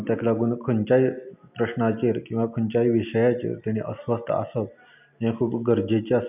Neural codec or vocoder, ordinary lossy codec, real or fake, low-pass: none; none; real; 3.6 kHz